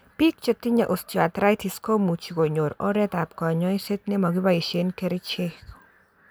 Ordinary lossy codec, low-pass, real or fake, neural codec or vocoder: none; none; real; none